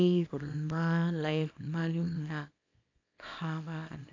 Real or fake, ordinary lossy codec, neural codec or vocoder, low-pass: fake; AAC, 48 kbps; codec, 24 kHz, 0.9 kbps, WavTokenizer, small release; 7.2 kHz